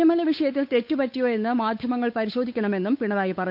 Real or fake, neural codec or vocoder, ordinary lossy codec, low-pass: fake; codec, 16 kHz, 8 kbps, FunCodec, trained on Chinese and English, 25 frames a second; none; 5.4 kHz